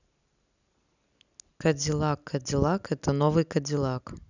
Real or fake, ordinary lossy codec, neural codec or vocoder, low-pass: real; none; none; 7.2 kHz